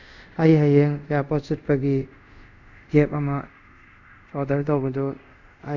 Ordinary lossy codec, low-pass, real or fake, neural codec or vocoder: none; 7.2 kHz; fake; codec, 24 kHz, 0.5 kbps, DualCodec